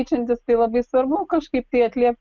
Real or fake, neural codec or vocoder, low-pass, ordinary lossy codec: real; none; 7.2 kHz; Opus, 32 kbps